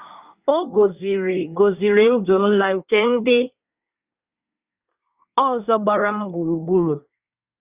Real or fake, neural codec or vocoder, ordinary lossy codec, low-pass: fake; codec, 24 kHz, 1 kbps, SNAC; Opus, 64 kbps; 3.6 kHz